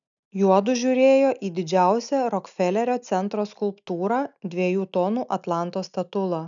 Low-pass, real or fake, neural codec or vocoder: 7.2 kHz; real; none